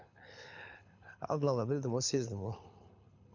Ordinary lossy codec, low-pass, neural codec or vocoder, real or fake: none; 7.2 kHz; codec, 24 kHz, 6 kbps, HILCodec; fake